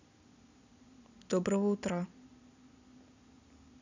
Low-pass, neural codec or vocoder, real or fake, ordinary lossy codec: 7.2 kHz; none; real; none